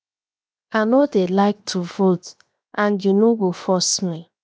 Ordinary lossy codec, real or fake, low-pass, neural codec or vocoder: none; fake; none; codec, 16 kHz, 0.7 kbps, FocalCodec